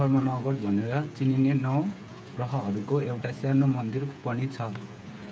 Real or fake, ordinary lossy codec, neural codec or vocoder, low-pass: fake; none; codec, 16 kHz, 8 kbps, FreqCodec, smaller model; none